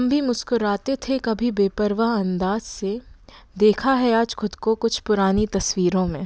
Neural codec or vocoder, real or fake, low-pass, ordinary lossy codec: none; real; none; none